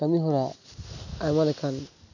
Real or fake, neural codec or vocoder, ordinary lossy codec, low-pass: real; none; none; 7.2 kHz